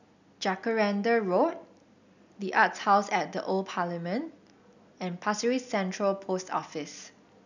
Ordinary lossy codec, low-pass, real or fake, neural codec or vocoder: none; 7.2 kHz; real; none